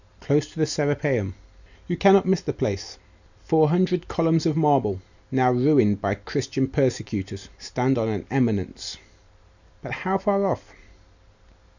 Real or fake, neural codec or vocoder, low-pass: real; none; 7.2 kHz